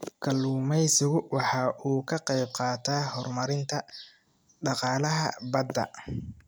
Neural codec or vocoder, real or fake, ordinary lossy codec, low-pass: none; real; none; none